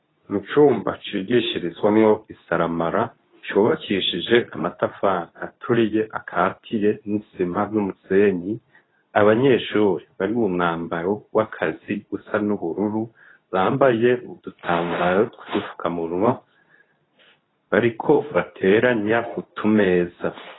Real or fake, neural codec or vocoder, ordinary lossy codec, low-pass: fake; codec, 24 kHz, 0.9 kbps, WavTokenizer, medium speech release version 2; AAC, 16 kbps; 7.2 kHz